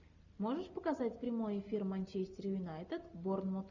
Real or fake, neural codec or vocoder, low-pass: real; none; 7.2 kHz